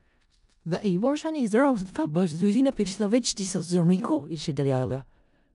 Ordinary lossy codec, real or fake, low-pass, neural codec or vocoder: none; fake; 10.8 kHz; codec, 16 kHz in and 24 kHz out, 0.4 kbps, LongCat-Audio-Codec, four codebook decoder